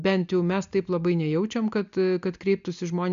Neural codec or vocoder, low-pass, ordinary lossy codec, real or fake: none; 7.2 kHz; AAC, 96 kbps; real